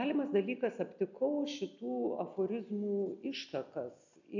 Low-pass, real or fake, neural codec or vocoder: 7.2 kHz; real; none